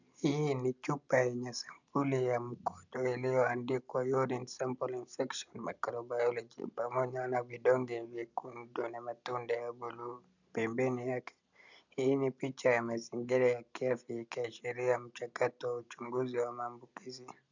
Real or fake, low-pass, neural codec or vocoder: real; 7.2 kHz; none